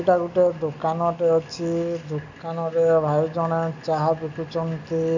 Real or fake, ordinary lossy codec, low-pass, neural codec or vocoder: real; none; 7.2 kHz; none